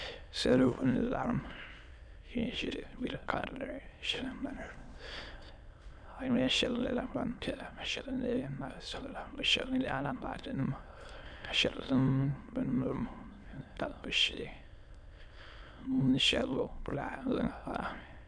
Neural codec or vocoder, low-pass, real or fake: autoencoder, 22.05 kHz, a latent of 192 numbers a frame, VITS, trained on many speakers; 9.9 kHz; fake